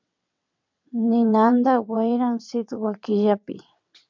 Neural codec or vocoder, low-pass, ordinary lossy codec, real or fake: vocoder, 22.05 kHz, 80 mel bands, WaveNeXt; 7.2 kHz; MP3, 64 kbps; fake